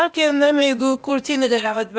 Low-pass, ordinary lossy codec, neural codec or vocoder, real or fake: none; none; codec, 16 kHz, 0.8 kbps, ZipCodec; fake